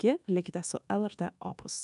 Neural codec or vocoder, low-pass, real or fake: codec, 24 kHz, 1.2 kbps, DualCodec; 10.8 kHz; fake